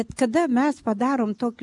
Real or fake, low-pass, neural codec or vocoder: real; 10.8 kHz; none